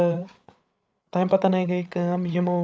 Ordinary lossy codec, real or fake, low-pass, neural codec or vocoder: none; fake; none; codec, 16 kHz, 8 kbps, FreqCodec, larger model